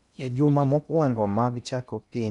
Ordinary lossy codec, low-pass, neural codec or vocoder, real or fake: none; 10.8 kHz; codec, 16 kHz in and 24 kHz out, 0.6 kbps, FocalCodec, streaming, 2048 codes; fake